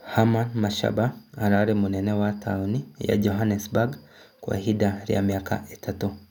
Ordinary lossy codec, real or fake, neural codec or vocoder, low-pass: none; real; none; 19.8 kHz